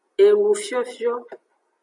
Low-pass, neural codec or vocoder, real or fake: 10.8 kHz; none; real